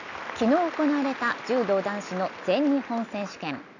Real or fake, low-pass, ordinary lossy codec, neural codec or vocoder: real; 7.2 kHz; none; none